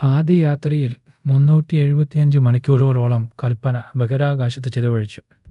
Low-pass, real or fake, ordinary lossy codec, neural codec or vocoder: 10.8 kHz; fake; none; codec, 24 kHz, 0.5 kbps, DualCodec